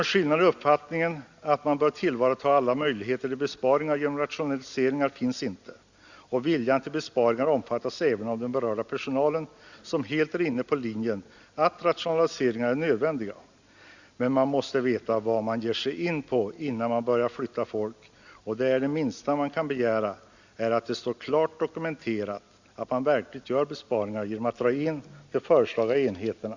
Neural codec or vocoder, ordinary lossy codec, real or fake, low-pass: none; Opus, 64 kbps; real; 7.2 kHz